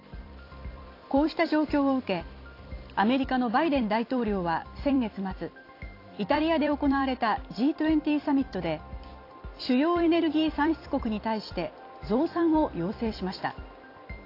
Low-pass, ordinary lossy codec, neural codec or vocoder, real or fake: 5.4 kHz; AAC, 32 kbps; vocoder, 44.1 kHz, 128 mel bands every 256 samples, BigVGAN v2; fake